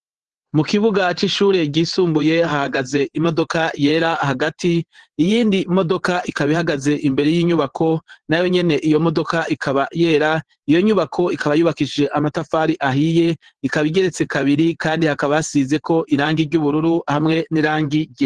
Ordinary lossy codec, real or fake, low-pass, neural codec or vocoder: Opus, 16 kbps; fake; 9.9 kHz; vocoder, 22.05 kHz, 80 mel bands, WaveNeXt